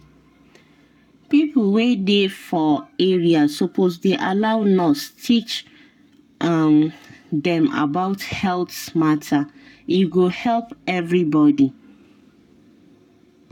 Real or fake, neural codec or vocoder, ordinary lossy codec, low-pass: fake; codec, 44.1 kHz, 7.8 kbps, Pupu-Codec; none; 19.8 kHz